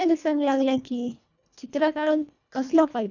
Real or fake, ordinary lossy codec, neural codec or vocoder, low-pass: fake; none; codec, 24 kHz, 1.5 kbps, HILCodec; 7.2 kHz